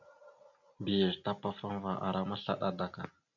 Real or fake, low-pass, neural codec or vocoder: real; 7.2 kHz; none